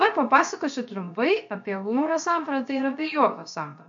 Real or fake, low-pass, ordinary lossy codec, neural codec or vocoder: fake; 7.2 kHz; MP3, 48 kbps; codec, 16 kHz, 0.7 kbps, FocalCodec